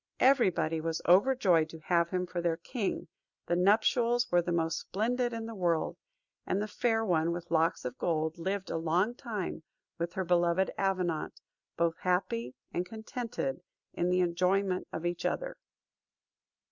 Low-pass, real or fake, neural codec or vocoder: 7.2 kHz; real; none